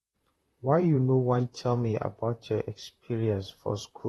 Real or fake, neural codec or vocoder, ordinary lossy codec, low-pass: fake; vocoder, 44.1 kHz, 128 mel bands, Pupu-Vocoder; AAC, 32 kbps; 19.8 kHz